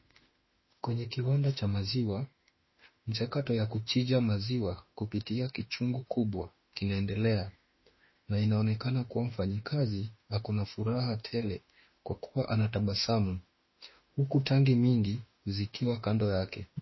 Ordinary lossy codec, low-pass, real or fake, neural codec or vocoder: MP3, 24 kbps; 7.2 kHz; fake; autoencoder, 48 kHz, 32 numbers a frame, DAC-VAE, trained on Japanese speech